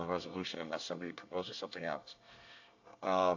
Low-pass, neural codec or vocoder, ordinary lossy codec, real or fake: 7.2 kHz; codec, 24 kHz, 1 kbps, SNAC; AAC, 48 kbps; fake